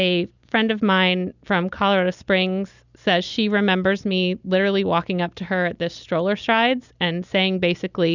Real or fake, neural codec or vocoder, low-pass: real; none; 7.2 kHz